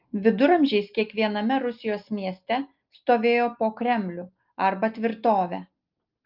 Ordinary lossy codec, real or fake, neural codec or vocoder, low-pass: Opus, 24 kbps; real; none; 5.4 kHz